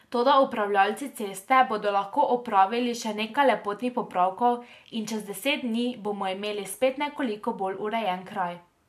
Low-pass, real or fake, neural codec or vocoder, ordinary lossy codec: 14.4 kHz; real; none; MP3, 64 kbps